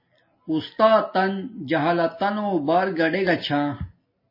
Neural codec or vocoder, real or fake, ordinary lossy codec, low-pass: none; real; MP3, 24 kbps; 5.4 kHz